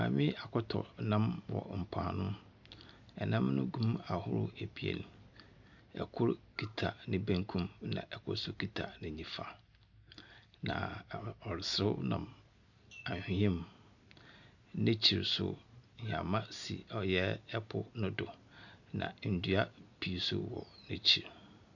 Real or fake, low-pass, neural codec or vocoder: real; 7.2 kHz; none